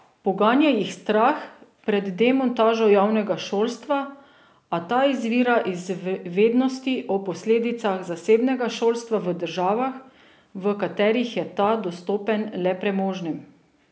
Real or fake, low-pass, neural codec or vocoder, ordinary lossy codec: real; none; none; none